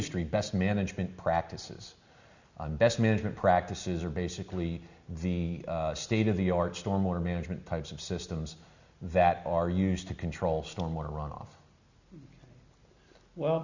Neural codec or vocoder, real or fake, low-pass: none; real; 7.2 kHz